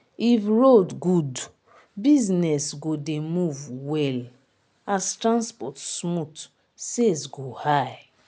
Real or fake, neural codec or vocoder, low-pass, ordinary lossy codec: real; none; none; none